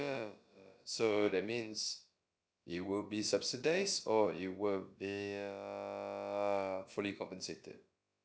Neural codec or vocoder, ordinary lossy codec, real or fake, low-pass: codec, 16 kHz, about 1 kbps, DyCAST, with the encoder's durations; none; fake; none